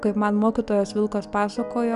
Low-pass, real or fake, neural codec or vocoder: 10.8 kHz; real; none